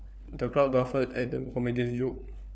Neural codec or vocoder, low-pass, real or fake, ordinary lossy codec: codec, 16 kHz, 4 kbps, FunCodec, trained on LibriTTS, 50 frames a second; none; fake; none